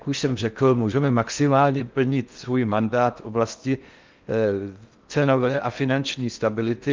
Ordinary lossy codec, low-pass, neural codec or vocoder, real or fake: Opus, 24 kbps; 7.2 kHz; codec, 16 kHz in and 24 kHz out, 0.6 kbps, FocalCodec, streaming, 4096 codes; fake